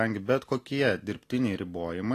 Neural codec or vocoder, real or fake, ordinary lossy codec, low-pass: none; real; AAC, 48 kbps; 14.4 kHz